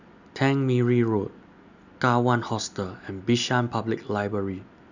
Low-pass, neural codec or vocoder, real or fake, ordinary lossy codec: 7.2 kHz; none; real; none